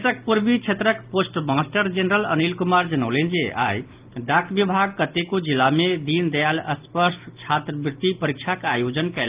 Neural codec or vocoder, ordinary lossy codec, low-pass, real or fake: none; Opus, 32 kbps; 3.6 kHz; real